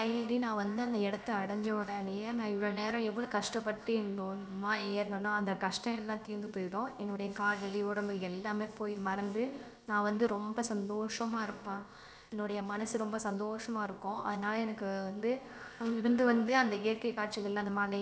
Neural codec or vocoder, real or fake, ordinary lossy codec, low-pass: codec, 16 kHz, about 1 kbps, DyCAST, with the encoder's durations; fake; none; none